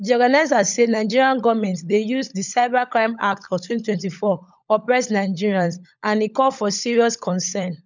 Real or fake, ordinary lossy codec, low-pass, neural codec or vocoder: fake; none; 7.2 kHz; codec, 16 kHz, 16 kbps, FunCodec, trained on LibriTTS, 50 frames a second